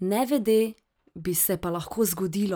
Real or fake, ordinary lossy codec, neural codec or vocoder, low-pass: real; none; none; none